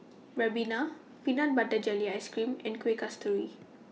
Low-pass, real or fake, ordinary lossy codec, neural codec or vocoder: none; real; none; none